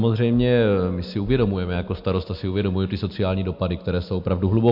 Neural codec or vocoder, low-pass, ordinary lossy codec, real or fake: none; 5.4 kHz; MP3, 48 kbps; real